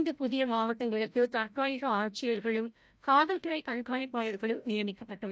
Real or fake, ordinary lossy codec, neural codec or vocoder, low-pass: fake; none; codec, 16 kHz, 0.5 kbps, FreqCodec, larger model; none